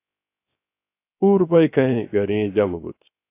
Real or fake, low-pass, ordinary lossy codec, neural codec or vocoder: fake; 3.6 kHz; AAC, 24 kbps; codec, 16 kHz, 0.3 kbps, FocalCodec